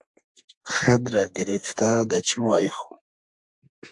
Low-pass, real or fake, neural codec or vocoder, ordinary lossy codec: 10.8 kHz; fake; codec, 32 kHz, 1.9 kbps, SNAC; AAC, 64 kbps